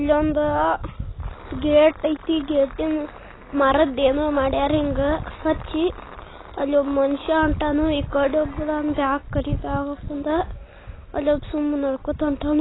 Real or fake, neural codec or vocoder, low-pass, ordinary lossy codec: real; none; 7.2 kHz; AAC, 16 kbps